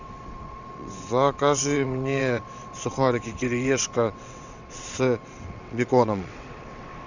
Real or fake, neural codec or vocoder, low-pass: fake; vocoder, 22.05 kHz, 80 mel bands, WaveNeXt; 7.2 kHz